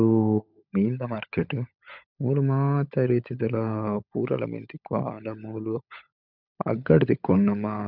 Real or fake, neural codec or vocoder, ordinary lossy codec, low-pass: fake; codec, 16 kHz, 16 kbps, FunCodec, trained on LibriTTS, 50 frames a second; none; 5.4 kHz